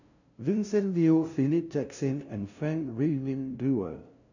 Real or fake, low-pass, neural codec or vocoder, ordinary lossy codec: fake; 7.2 kHz; codec, 16 kHz, 0.5 kbps, FunCodec, trained on LibriTTS, 25 frames a second; none